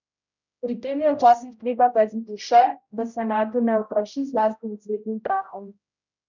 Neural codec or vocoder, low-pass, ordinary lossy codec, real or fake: codec, 16 kHz, 0.5 kbps, X-Codec, HuBERT features, trained on general audio; 7.2 kHz; none; fake